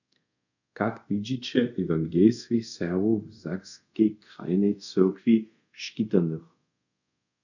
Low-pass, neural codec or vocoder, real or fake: 7.2 kHz; codec, 24 kHz, 0.5 kbps, DualCodec; fake